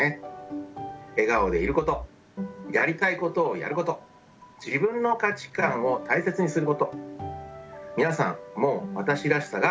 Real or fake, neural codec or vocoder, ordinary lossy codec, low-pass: real; none; none; none